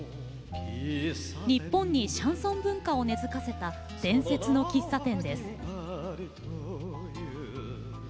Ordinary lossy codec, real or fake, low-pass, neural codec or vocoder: none; real; none; none